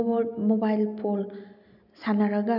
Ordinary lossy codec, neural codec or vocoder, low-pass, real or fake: none; none; 5.4 kHz; real